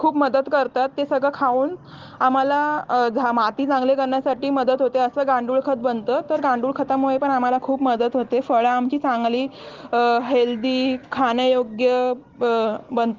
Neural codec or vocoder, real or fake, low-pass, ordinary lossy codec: none; real; 7.2 kHz; Opus, 16 kbps